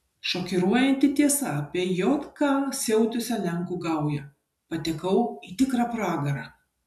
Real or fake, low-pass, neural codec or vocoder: real; 14.4 kHz; none